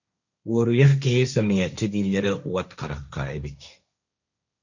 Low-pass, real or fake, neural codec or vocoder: 7.2 kHz; fake; codec, 16 kHz, 1.1 kbps, Voila-Tokenizer